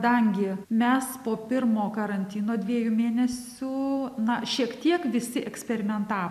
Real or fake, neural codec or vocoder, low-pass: real; none; 14.4 kHz